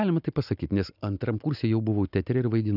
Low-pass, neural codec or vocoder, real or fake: 5.4 kHz; none; real